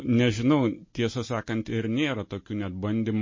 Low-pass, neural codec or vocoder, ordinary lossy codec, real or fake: 7.2 kHz; none; MP3, 32 kbps; real